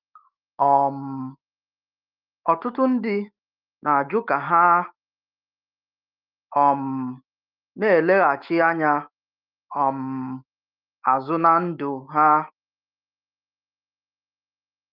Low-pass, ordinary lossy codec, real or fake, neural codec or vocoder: 5.4 kHz; Opus, 24 kbps; fake; autoencoder, 48 kHz, 128 numbers a frame, DAC-VAE, trained on Japanese speech